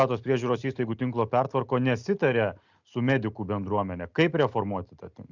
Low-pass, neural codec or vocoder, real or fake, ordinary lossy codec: 7.2 kHz; none; real; Opus, 64 kbps